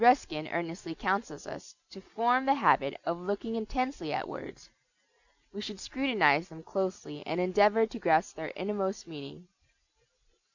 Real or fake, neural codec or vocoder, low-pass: real; none; 7.2 kHz